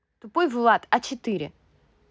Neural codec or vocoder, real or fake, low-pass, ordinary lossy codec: none; real; none; none